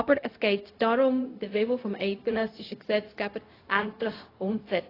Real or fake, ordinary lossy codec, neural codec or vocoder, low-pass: fake; AAC, 24 kbps; codec, 16 kHz, 0.4 kbps, LongCat-Audio-Codec; 5.4 kHz